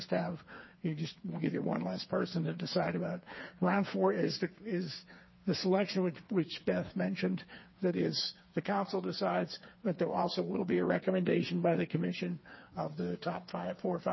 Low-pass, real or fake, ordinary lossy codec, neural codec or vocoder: 7.2 kHz; fake; MP3, 24 kbps; codec, 16 kHz, 4 kbps, FreqCodec, smaller model